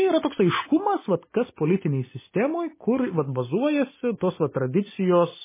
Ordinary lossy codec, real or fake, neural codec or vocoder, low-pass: MP3, 16 kbps; real; none; 3.6 kHz